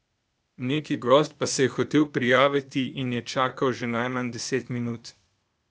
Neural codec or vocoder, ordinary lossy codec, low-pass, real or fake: codec, 16 kHz, 0.8 kbps, ZipCodec; none; none; fake